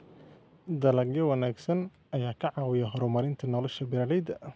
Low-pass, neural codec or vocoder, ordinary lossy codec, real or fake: none; none; none; real